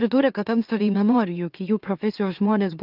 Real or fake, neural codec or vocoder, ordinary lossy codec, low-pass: fake; autoencoder, 44.1 kHz, a latent of 192 numbers a frame, MeloTTS; Opus, 24 kbps; 5.4 kHz